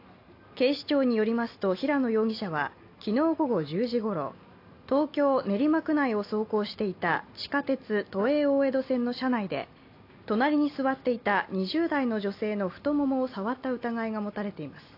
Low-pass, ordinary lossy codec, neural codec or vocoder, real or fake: 5.4 kHz; AAC, 32 kbps; none; real